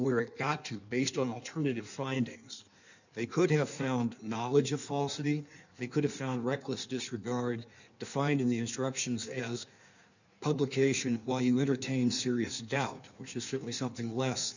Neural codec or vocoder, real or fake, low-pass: codec, 16 kHz in and 24 kHz out, 1.1 kbps, FireRedTTS-2 codec; fake; 7.2 kHz